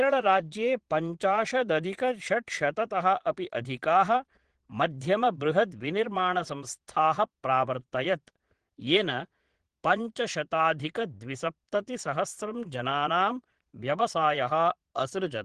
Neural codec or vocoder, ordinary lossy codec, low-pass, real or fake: vocoder, 22.05 kHz, 80 mel bands, Vocos; Opus, 16 kbps; 9.9 kHz; fake